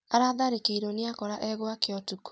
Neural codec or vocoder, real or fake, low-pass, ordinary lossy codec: none; real; none; none